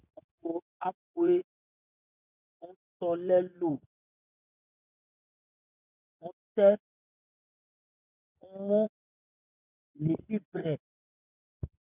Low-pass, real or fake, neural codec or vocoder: 3.6 kHz; fake; vocoder, 24 kHz, 100 mel bands, Vocos